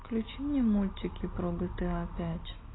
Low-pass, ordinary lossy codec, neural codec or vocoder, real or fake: 7.2 kHz; AAC, 16 kbps; none; real